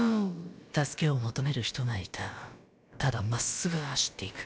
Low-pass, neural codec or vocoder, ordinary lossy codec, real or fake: none; codec, 16 kHz, about 1 kbps, DyCAST, with the encoder's durations; none; fake